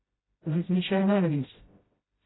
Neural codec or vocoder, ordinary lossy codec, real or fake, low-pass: codec, 16 kHz, 0.5 kbps, FreqCodec, smaller model; AAC, 16 kbps; fake; 7.2 kHz